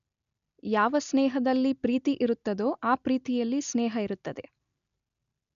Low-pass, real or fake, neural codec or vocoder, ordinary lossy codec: 7.2 kHz; real; none; none